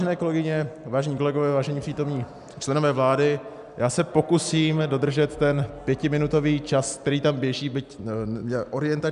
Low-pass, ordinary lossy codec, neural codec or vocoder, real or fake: 10.8 kHz; AAC, 96 kbps; none; real